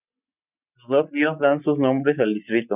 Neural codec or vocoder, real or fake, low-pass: none; real; 3.6 kHz